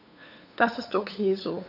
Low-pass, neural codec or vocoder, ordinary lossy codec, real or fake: 5.4 kHz; codec, 16 kHz, 8 kbps, FunCodec, trained on LibriTTS, 25 frames a second; none; fake